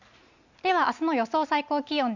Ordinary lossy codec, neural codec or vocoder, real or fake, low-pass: none; none; real; 7.2 kHz